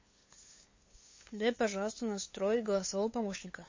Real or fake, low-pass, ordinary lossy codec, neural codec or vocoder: fake; 7.2 kHz; MP3, 32 kbps; codec, 16 kHz, 8 kbps, FunCodec, trained on LibriTTS, 25 frames a second